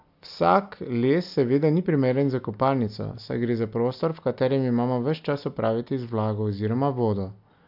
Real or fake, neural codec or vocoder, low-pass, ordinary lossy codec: real; none; 5.4 kHz; AAC, 48 kbps